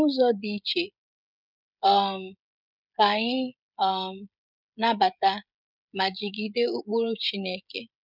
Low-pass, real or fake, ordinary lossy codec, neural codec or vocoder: 5.4 kHz; fake; none; codec, 16 kHz, 16 kbps, FreqCodec, smaller model